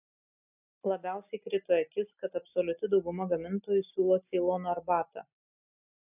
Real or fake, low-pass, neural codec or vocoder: real; 3.6 kHz; none